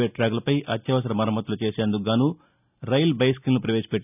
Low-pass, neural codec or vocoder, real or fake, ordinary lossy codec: 3.6 kHz; none; real; none